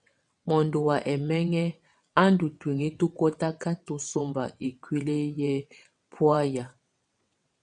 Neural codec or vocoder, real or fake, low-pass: vocoder, 22.05 kHz, 80 mel bands, WaveNeXt; fake; 9.9 kHz